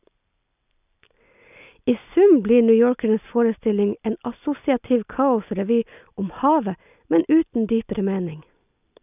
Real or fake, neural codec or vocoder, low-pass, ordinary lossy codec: real; none; 3.6 kHz; none